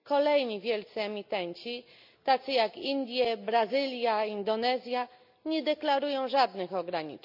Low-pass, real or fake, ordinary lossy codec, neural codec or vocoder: 5.4 kHz; real; none; none